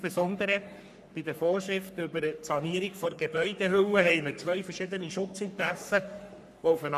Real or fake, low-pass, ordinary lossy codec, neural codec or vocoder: fake; 14.4 kHz; none; codec, 44.1 kHz, 3.4 kbps, Pupu-Codec